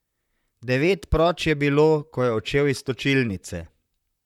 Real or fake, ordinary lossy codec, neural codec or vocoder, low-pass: fake; none; vocoder, 44.1 kHz, 128 mel bands, Pupu-Vocoder; 19.8 kHz